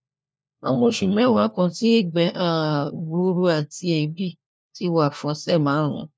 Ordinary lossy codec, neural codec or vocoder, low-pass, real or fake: none; codec, 16 kHz, 1 kbps, FunCodec, trained on LibriTTS, 50 frames a second; none; fake